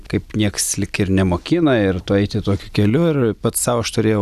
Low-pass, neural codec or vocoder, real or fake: 14.4 kHz; none; real